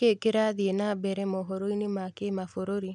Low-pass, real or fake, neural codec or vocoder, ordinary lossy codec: 10.8 kHz; real; none; none